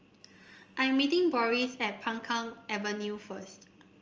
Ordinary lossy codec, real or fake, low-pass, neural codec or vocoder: Opus, 24 kbps; real; 7.2 kHz; none